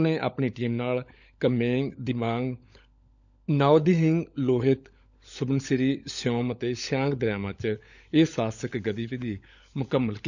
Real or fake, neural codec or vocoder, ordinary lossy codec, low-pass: fake; codec, 16 kHz, 16 kbps, FunCodec, trained on LibriTTS, 50 frames a second; none; 7.2 kHz